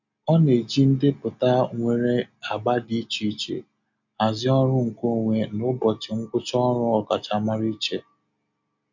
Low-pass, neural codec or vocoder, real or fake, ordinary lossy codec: 7.2 kHz; none; real; none